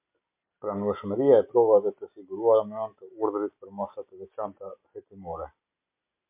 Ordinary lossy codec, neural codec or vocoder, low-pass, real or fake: MP3, 32 kbps; none; 3.6 kHz; real